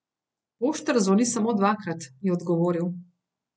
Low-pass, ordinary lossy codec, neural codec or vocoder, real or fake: none; none; none; real